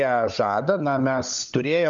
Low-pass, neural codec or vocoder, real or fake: 7.2 kHz; codec, 16 kHz, 16 kbps, FunCodec, trained on LibriTTS, 50 frames a second; fake